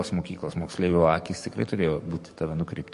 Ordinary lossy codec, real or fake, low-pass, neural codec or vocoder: MP3, 48 kbps; fake; 14.4 kHz; codec, 44.1 kHz, 7.8 kbps, DAC